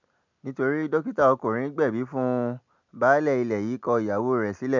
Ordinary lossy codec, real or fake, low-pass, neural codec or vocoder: MP3, 64 kbps; real; 7.2 kHz; none